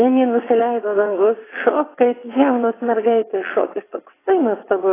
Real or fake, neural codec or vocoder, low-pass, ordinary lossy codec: fake; vocoder, 22.05 kHz, 80 mel bands, WaveNeXt; 3.6 kHz; AAC, 16 kbps